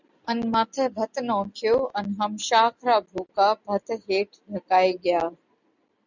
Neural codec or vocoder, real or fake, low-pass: none; real; 7.2 kHz